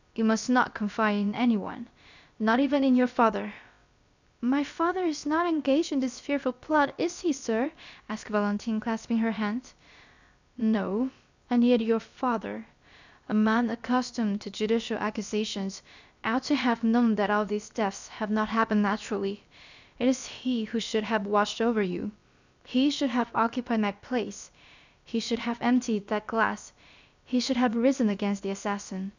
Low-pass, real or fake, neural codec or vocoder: 7.2 kHz; fake; codec, 16 kHz, about 1 kbps, DyCAST, with the encoder's durations